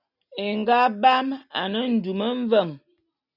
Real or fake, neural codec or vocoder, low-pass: real; none; 5.4 kHz